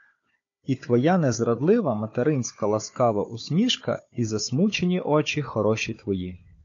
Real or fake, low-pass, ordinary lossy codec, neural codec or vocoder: fake; 7.2 kHz; MP3, 48 kbps; codec, 16 kHz, 4 kbps, FunCodec, trained on Chinese and English, 50 frames a second